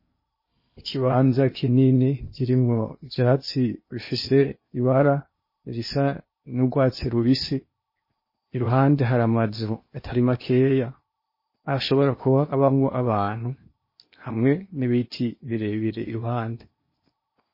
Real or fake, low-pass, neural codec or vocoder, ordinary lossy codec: fake; 5.4 kHz; codec, 16 kHz in and 24 kHz out, 0.8 kbps, FocalCodec, streaming, 65536 codes; MP3, 24 kbps